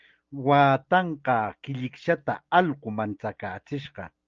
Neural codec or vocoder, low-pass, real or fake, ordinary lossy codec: none; 7.2 kHz; real; Opus, 24 kbps